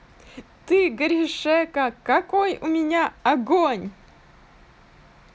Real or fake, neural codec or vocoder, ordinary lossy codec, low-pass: real; none; none; none